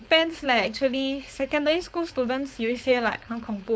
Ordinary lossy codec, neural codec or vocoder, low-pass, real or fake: none; codec, 16 kHz, 4.8 kbps, FACodec; none; fake